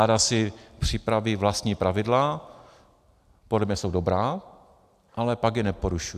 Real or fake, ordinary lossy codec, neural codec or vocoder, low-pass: real; AAC, 96 kbps; none; 14.4 kHz